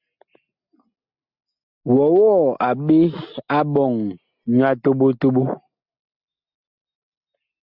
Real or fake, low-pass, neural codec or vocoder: real; 5.4 kHz; none